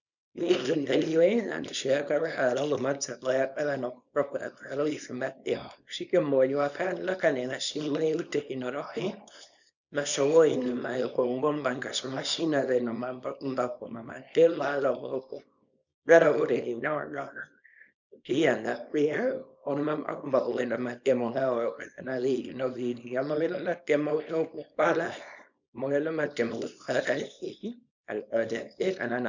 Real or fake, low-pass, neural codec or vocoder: fake; 7.2 kHz; codec, 24 kHz, 0.9 kbps, WavTokenizer, small release